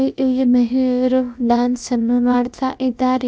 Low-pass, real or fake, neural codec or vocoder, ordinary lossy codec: none; fake; codec, 16 kHz, about 1 kbps, DyCAST, with the encoder's durations; none